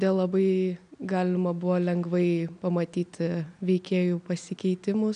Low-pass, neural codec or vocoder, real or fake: 9.9 kHz; none; real